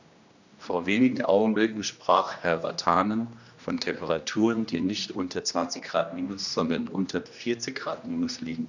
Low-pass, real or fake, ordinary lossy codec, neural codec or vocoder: 7.2 kHz; fake; none; codec, 16 kHz, 1 kbps, X-Codec, HuBERT features, trained on general audio